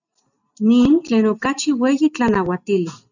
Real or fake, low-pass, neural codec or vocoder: real; 7.2 kHz; none